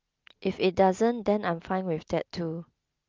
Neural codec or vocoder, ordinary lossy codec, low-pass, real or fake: none; Opus, 24 kbps; 7.2 kHz; real